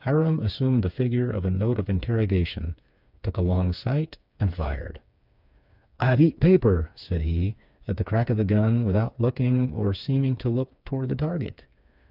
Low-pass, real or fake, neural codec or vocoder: 5.4 kHz; fake; codec, 16 kHz, 4 kbps, FreqCodec, smaller model